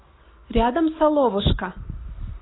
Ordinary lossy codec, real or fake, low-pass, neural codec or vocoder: AAC, 16 kbps; real; 7.2 kHz; none